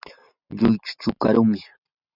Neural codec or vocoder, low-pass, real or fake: vocoder, 44.1 kHz, 128 mel bands every 512 samples, BigVGAN v2; 5.4 kHz; fake